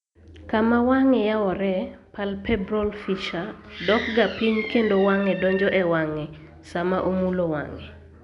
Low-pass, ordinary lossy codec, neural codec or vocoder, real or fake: 10.8 kHz; none; none; real